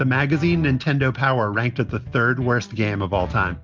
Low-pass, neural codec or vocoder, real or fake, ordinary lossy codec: 7.2 kHz; none; real; Opus, 32 kbps